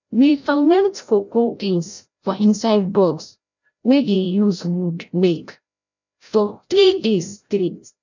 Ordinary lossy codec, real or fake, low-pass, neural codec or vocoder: none; fake; 7.2 kHz; codec, 16 kHz, 0.5 kbps, FreqCodec, larger model